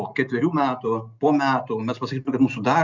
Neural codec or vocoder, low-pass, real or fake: none; 7.2 kHz; real